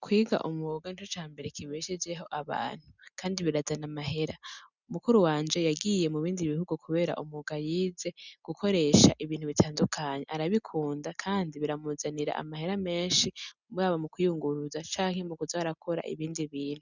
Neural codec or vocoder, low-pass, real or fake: none; 7.2 kHz; real